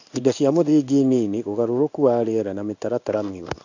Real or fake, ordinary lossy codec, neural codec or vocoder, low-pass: fake; none; codec, 16 kHz in and 24 kHz out, 1 kbps, XY-Tokenizer; 7.2 kHz